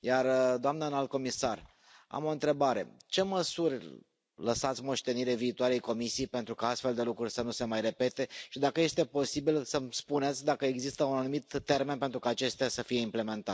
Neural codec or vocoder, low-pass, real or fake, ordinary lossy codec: none; none; real; none